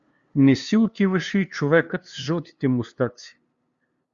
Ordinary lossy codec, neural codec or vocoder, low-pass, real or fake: Opus, 64 kbps; codec, 16 kHz, 2 kbps, FunCodec, trained on LibriTTS, 25 frames a second; 7.2 kHz; fake